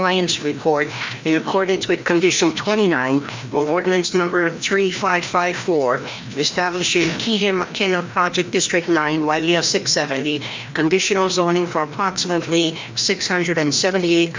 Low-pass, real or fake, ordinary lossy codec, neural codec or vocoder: 7.2 kHz; fake; MP3, 64 kbps; codec, 16 kHz, 1 kbps, FreqCodec, larger model